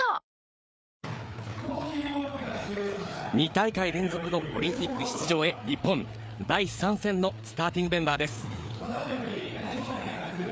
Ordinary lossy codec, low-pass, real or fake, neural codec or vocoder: none; none; fake; codec, 16 kHz, 4 kbps, FreqCodec, larger model